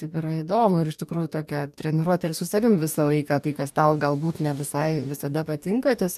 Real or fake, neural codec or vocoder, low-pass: fake; codec, 44.1 kHz, 2.6 kbps, DAC; 14.4 kHz